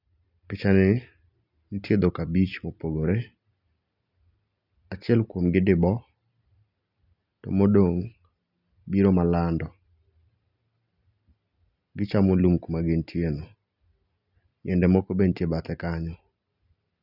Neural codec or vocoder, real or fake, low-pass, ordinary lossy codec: none; real; 5.4 kHz; none